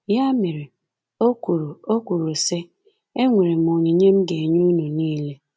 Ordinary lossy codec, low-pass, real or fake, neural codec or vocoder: none; none; real; none